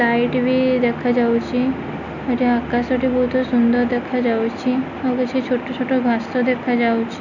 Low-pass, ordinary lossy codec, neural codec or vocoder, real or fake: 7.2 kHz; none; none; real